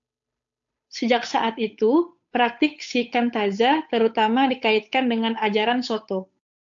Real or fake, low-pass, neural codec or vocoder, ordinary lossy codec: fake; 7.2 kHz; codec, 16 kHz, 8 kbps, FunCodec, trained on Chinese and English, 25 frames a second; AAC, 64 kbps